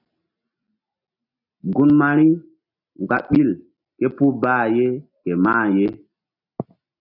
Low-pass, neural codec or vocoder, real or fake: 5.4 kHz; none; real